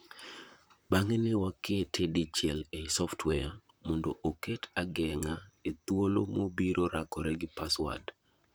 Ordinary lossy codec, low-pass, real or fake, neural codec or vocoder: none; none; fake; vocoder, 44.1 kHz, 128 mel bands, Pupu-Vocoder